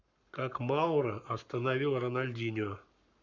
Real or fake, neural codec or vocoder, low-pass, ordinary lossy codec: fake; codec, 44.1 kHz, 7.8 kbps, Pupu-Codec; 7.2 kHz; none